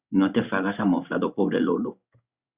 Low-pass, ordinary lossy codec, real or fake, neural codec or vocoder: 3.6 kHz; Opus, 64 kbps; fake; codec, 16 kHz in and 24 kHz out, 1 kbps, XY-Tokenizer